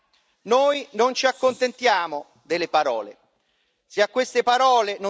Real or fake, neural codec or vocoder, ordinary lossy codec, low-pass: real; none; none; none